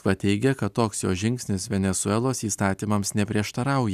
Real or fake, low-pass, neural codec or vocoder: real; 14.4 kHz; none